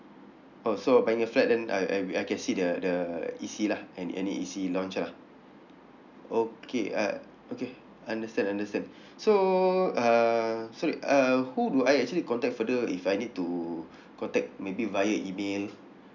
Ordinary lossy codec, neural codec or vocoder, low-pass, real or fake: none; none; 7.2 kHz; real